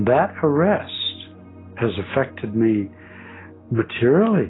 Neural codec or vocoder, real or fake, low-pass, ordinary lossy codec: none; real; 7.2 kHz; AAC, 16 kbps